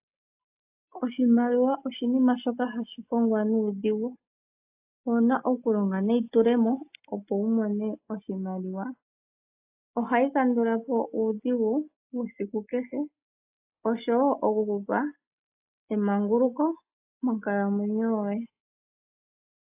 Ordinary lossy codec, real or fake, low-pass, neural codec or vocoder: AAC, 32 kbps; real; 3.6 kHz; none